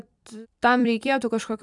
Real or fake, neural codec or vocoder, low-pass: fake; vocoder, 44.1 kHz, 128 mel bands every 256 samples, BigVGAN v2; 10.8 kHz